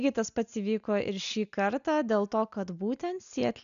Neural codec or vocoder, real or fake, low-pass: none; real; 7.2 kHz